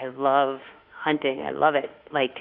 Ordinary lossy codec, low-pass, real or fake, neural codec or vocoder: Opus, 64 kbps; 5.4 kHz; fake; autoencoder, 48 kHz, 32 numbers a frame, DAC-VAE, trained on Japanese speech